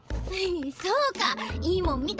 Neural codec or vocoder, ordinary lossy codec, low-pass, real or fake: codec, 16 kHz, 16 kbps, FreqCodec, larger model; none; none; fake